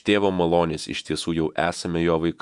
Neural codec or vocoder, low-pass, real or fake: vocoder, 48 kHz, 128 mel bands, Vocos; 10.8 kHz; fake